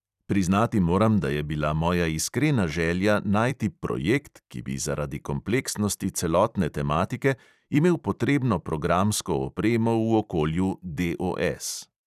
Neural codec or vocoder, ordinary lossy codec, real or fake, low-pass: vocoder, 44.1 kHz, 128 mel bands every 256 samples, BigVGAN v2; none; fake; 14.4 kHz